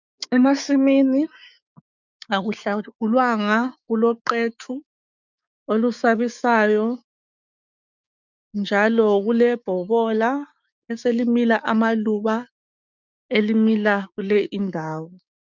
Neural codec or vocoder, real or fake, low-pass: codec, 16 kHz, 6 kbps, DAC; fake; 7.2 kHz